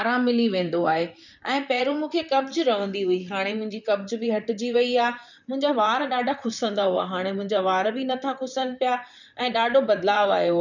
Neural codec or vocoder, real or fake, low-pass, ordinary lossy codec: vocoder, 44.1 kHz, 128 mel bands, Pupu-Vocoder; fake; 7.2 kHz; none